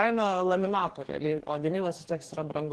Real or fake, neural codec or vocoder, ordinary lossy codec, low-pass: fake; codec, 44.1 kHz, 2.6 kbps, DAC; Opus, 16 kbps; 10.8 kHz